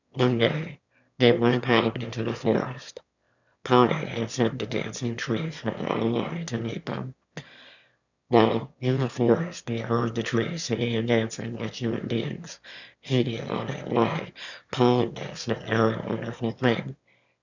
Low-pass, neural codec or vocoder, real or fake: 7.2 kHz; autoencoder, 22.05 kHz, a latent of 192 numbers a frame, VITS, trained on one speaker; fake